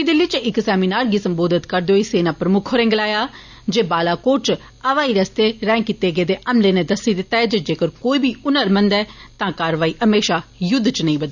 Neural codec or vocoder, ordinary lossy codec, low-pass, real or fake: none; none; 7.2 kHz; real